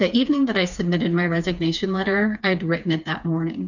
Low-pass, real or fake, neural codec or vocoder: 7.2 kHz; fake; codec, 16 kHz, 4 kbps, FreqCodec, smaller model